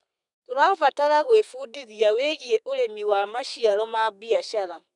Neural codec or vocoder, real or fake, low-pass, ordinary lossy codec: codec, 32 kHz, 1.9 kbps, SNAC; fake; 10.8 kHz; none